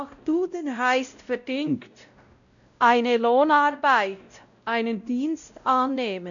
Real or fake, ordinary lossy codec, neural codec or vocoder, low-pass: fake; none; codec, 16 kHz, 0.5 kbps, X-Codec, WavLM features, trained on Multilingual LibriSpeech; 7.2 kHz